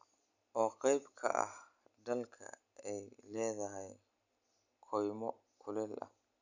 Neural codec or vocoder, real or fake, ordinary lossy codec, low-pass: none; real; none; 7.2 kHz